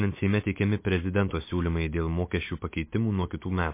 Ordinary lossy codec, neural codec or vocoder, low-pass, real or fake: MP3, 24 kbps; none; 3.6 kHz; real